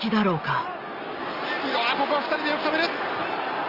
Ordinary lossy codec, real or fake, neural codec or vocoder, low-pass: Opus, 24 kbps; real; none; 5.4 kHz